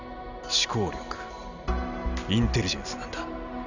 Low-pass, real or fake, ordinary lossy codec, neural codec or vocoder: 7.2 kHz; real; none; none